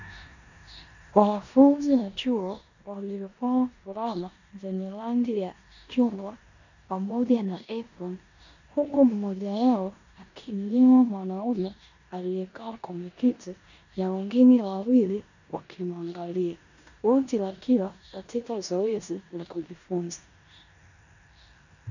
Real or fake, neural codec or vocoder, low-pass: fake; codec, 16 kHz in and 24 kHz out, 0.9 kbps, LongCat-Audio-Codec, four codebook decoder; 7.2 kHz